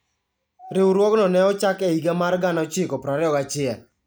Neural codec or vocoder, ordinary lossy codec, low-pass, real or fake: none; none; none; real